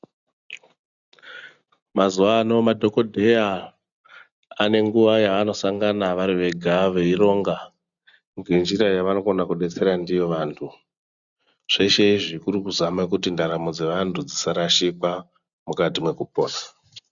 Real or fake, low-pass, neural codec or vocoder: real; 7.2 kHz; none